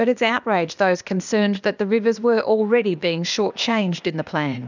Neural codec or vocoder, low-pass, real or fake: codec, 16 kHz, 0.8 kbps, ZipCodec; 7.2 kHz; fake